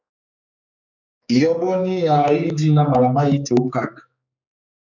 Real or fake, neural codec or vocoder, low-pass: fake; codec, 16 kHz, 4 kbps, X-Codec, HuBERT features, trained on general audio; 7.2 kHz